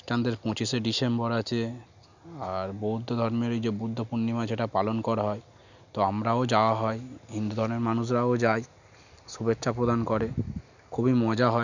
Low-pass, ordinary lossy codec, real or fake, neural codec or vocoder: 7.2 kHz; none; real; none